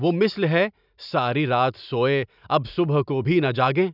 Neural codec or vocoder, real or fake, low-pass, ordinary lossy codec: none; real; 5.4 kHz; none